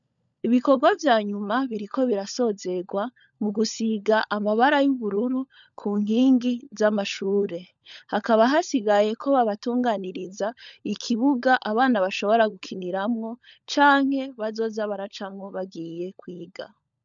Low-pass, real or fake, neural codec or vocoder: 7.2 kHz; fake; codec, 16 kHz, 16 kbps, FunCodec, trained on LibriTTS, 50 frames a second